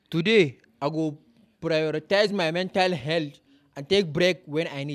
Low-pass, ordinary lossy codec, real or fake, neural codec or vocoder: 14.4 kHz; none; fake; vocoder, 44.1 kHz, 128 mel bands every 256 samples, BigVGAN v2